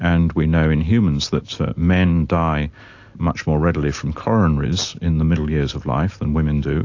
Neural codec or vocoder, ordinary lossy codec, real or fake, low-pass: none; AAC, 48 kbps; real; 7.2 kHz